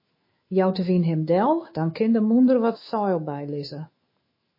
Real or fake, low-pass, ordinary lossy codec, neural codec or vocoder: fake; 5.4 kHz; MP3, 24 kbps; autoencoder, 48 kHz, 128 numbers a frame, DAC-VAE, trained on Japanese speech